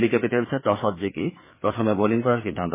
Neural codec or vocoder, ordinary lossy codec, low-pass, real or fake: codec, 16 kHz, 4 kbps, FreqCodec, larger model; MP3, 16 kbps; 3.6 kHz; fake